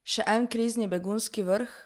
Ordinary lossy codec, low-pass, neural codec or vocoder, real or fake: Opus, 32 kbps; 19.8 kHz; none; real